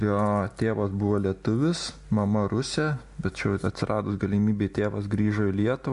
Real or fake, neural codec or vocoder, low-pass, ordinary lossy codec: real; none; 10.8 kHz; MP3, 64 kbps